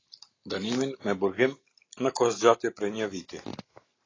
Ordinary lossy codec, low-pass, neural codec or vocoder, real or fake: AAC, 32 kbps; 7.2 kHz; none; real